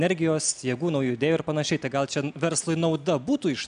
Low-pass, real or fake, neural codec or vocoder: 9.9 kHz; real; none